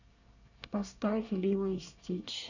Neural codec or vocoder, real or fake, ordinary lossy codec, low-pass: codec, 24 kHz, 1 kbps, SNAC; fake; none; 7.2 kHz